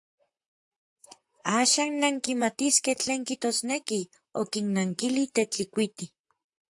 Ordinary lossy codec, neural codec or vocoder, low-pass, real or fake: AAC, 64 kbps; vocoder, 44.1 kHz, 128 mel bands, Pupu-Vocoder; 10.8 kHz; fake